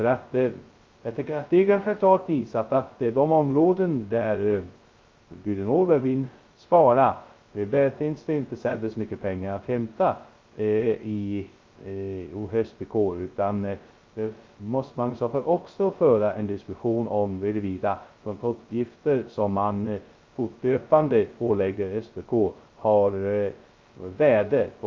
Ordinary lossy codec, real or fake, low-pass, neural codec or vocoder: Opus, 24 kbps; fake; 7.2 kHz; codec, 16 kHz, 0.2 kbps, FocalCodec